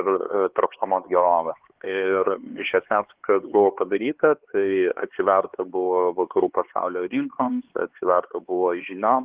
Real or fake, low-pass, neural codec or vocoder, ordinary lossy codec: fake; 3.6 kHz; codec, 16 kHz, 4 kbps, X-Codec, HuBERT features, trained on LibriSpeech; Opus, 16 kbps